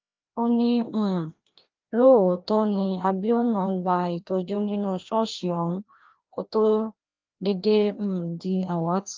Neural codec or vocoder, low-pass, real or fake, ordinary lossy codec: codec, 16 kHz, 1 kbps, FreqCodec, larger model; 7.2 kHz; fake; Opus, 32 kbps